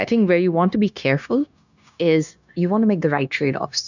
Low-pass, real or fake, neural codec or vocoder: 7.2 kHz; fake; codec, 16 kHz, 0.9 kbps, LongCat-Audio-Codec